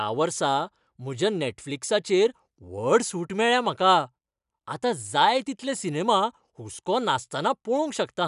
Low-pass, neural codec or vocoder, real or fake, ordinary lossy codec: 10.8 kHz; none; real; none